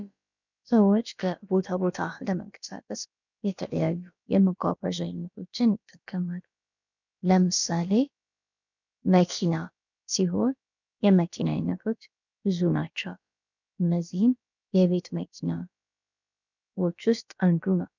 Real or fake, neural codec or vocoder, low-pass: fake; codec, 16 kHz, about 1 kbps, DyCAST, with the encoder's durations; 7.2 kHz